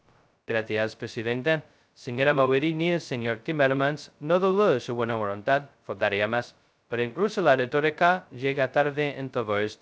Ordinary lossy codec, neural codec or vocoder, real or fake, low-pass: none; codec, 16 kHz, 0.2 kbps, FocalCodec; fake; none